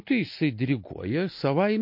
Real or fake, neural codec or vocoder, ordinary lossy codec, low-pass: real; none; MP3, 32 kbps; 5.4 kHz